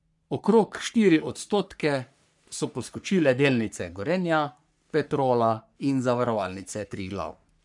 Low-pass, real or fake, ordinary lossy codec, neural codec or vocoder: 10.8 kHz; fake; MP3, 96 kbps; codec, 44.1 kHz, 3.4 kbps, Pupu-Codec